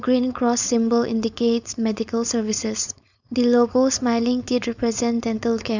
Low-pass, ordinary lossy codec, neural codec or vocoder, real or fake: 7.2 kHz; none; codec, 16 kHz, 4.8 kbps, FACodec; fake